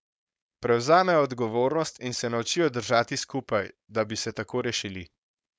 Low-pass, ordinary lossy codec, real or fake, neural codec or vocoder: none; none; fake; codec, 16 kHz, 4.8 kbps, FACodec